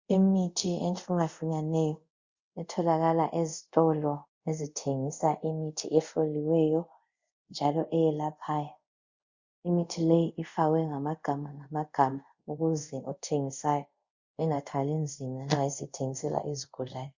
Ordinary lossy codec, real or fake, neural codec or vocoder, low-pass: Opus, 64 kbps; fake; codec, 24 kHz, 0.5 kbps, DualCodec; 7.2 kHz